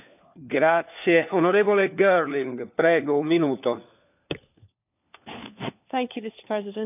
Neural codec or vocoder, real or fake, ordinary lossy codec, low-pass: codec, 16 kHz, 4 kbps, FunCodec, trained on LibriTTS, 50 frames a second; fake; none; 3.6 kHz